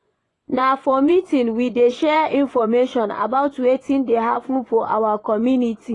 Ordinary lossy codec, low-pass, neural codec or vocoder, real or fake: AAC, 32 kbps; 10.8 kHz; vocoder, 24 kHz, 100 mel bands, Vocos; fake